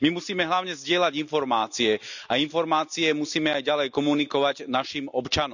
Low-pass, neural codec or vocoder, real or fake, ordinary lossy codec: 7.2 kHz; none; real; none